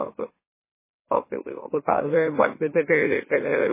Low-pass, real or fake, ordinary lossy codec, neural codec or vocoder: 3.6 kHz; fake; MP3, 16 kbps; autoencoder, 44.1 kHz, a latent of 192 numbers a frame, MeloTTS